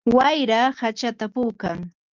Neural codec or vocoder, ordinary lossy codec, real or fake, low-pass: none; Opus, 24 kbps; real; 7.2 kHz